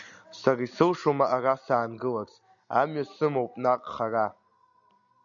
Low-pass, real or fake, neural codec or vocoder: 7.2 kHz; real; none